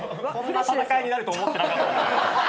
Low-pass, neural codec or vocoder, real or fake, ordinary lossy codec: none; none; real; none